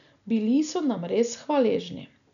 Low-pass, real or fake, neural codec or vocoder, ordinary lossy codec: 7.2 kHz; real; none; none